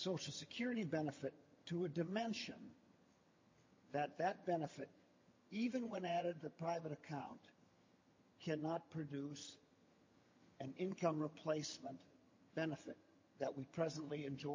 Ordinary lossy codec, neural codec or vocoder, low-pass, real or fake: MP3, 32 kbps; vocoder, 22.05 kHz, 80 mel bands, HiFi-GAN; 7.2 kHz; fake